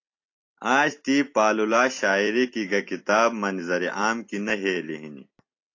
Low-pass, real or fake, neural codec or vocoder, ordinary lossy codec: 7.2 kHz; fake; vocoder, 44.1 kHz, 128 mel bands every 256 samples, BigVGAN v2; AAC, 48 kbps